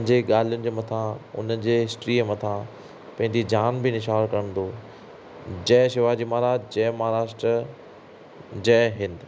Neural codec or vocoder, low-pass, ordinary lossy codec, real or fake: none; none; none; real